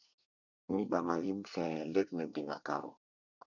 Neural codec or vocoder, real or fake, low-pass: codec, 24 kHz, 1 kbps, SNAC; fake; 7.2 kHz